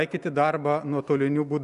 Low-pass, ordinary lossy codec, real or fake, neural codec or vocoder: 10.8 kHz; AAC, 96 kbps; real; none